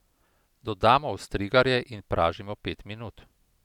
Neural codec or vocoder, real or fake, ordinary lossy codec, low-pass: none; real; none; 19.8 kHz